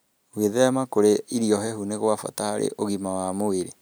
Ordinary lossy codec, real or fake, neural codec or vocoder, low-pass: none; real; none; none